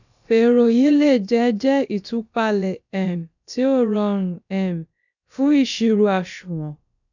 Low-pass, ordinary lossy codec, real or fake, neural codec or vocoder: 7.2 kHz; none; fake; codec, 16 kHz, about 1 kbps, DyCAST, with the encoder's durations